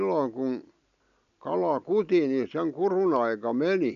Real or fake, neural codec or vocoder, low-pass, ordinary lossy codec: real; none; 7.2 kHz; none